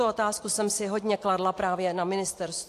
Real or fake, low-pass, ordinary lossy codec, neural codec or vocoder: real; 14.4 kHz; AAC, 64 kbps; none